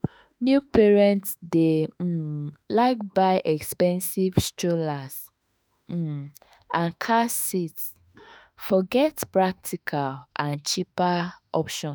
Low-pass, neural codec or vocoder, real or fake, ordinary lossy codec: none; autoencoder, 48 kHz, 32 numbers a frame, DAC-VAE, trained on Japanese speech; fake; none